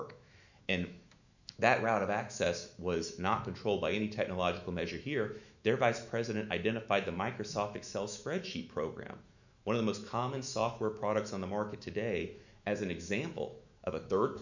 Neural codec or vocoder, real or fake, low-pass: autoencoder, 48 kHz, 128 numbers a frame, DAC-VAE, trained on Japanese speech; fake; 7.2 kHz